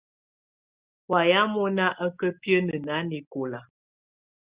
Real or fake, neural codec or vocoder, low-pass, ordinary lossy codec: real; none; 3.6 kHz; Opus, 64 kbps